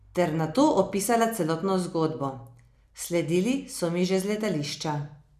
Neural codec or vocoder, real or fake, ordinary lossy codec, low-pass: none; real; none; 14.4 kHz